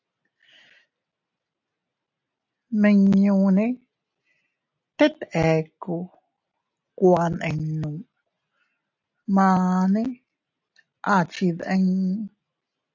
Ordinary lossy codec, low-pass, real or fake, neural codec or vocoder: AAC, 48 kbps; 7.2 kHz; real; none